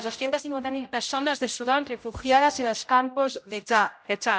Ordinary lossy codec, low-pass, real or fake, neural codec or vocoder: none; none; fake; codec, 16 kHz, 0.5 kbps, X-Codec, HuBERT features, trained on general audio